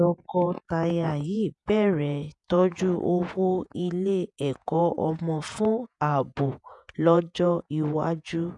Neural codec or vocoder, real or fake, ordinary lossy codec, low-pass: vocoder, 48 kHz, 128 mel bands, Vocos; fake; none; 10.8 kHz